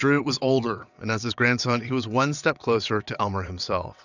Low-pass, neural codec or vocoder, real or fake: 7.2 kHz; vocoder, 22.05 kHz, 80 mel bands, WaveNeXt; fake